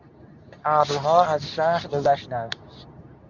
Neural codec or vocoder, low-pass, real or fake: codec, 24 kHz, 0.9 kbps, WavTokenizer, medium speech release version 2; 7.2 kHz; fake